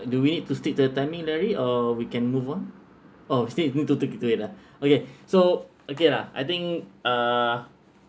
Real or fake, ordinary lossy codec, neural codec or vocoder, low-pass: real; none; none; none